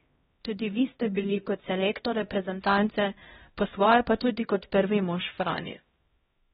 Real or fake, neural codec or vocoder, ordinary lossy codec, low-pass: fake; codec, 16 kHz, 0.5 kbps, X-Codec, HuBERT features, trained on LibriSpeech; AAC, 16 kbps; 7.2 kHz